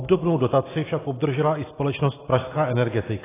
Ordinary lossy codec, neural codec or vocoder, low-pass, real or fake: AAC, 16 kbps; none; 3.6 kHz; real